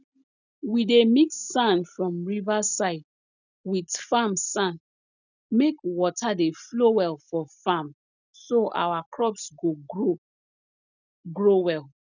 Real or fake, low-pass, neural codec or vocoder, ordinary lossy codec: real; 7.2 kHz; none; none